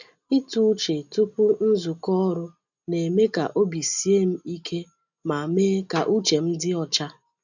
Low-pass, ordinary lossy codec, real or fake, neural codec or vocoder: 7.2 kHz; none; real; none